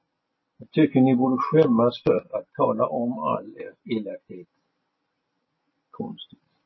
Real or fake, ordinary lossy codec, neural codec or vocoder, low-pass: real; MP3, 24 kbps; none; 7.2 kHz